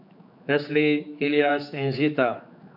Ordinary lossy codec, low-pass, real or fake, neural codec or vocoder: none; 5.4 kHz; fake; codec, 16 kHz, 4 kbps, X-Codec, HuBERT features, trained on general audio